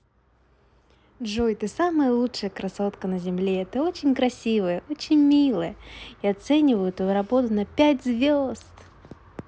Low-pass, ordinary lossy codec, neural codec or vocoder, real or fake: none; none; none; real